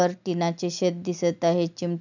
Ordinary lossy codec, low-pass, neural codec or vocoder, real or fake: none; 7.2 kHz; none; real